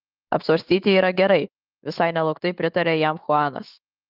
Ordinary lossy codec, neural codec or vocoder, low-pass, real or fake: Opus, 24 kbps; autoencoder, 48 kHz, 128 numbers a frame, DAC-VAE, trained on Japanese speech; 5.4 kHz; fake